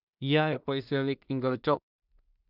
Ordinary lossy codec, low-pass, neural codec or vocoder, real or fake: none; 5.4 kHz; codec, 16 kHz in and 24 kHz out, 0.4 kbps, LongCat-Audio-Codec, two codebook decoder; fake